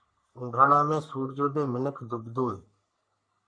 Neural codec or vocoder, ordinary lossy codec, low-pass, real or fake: codec, 44.1 kHz, 3.4 kbps, Pupu-Codec; MP3, 64 kbps; 9.9 kHz; fake